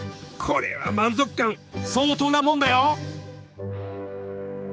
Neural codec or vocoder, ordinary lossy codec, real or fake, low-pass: codec, 16 kHz, 4 kbps, X-Codec, HuBERT features, trained on general audio; none; fake; none